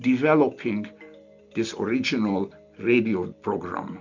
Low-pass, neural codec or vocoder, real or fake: 7.2 kHz; none; real